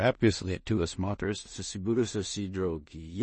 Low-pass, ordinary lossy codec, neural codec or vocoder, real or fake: 10.8 kHz; MP3, 32 kbps; codec, 16 kHz in and 24 kHz out, 0.4 kbps, LongCat-Audio-Codec, two codebook decoder; fake